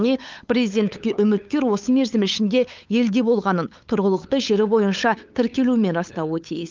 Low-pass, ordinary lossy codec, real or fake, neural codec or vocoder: 7.2 kHz; Opus, 24 kbps; fake; codec, 16 kHz, 8 kbps, FunCodec, trained on LibriTTS, 25 frames a second